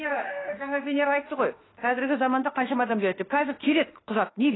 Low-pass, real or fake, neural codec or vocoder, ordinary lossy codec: 7.2 kHz; fake; autoencoder, 48 kHz, 32 numbers a frame, DAC-VAE, trained on Japanese speech; AAC, 16 kbps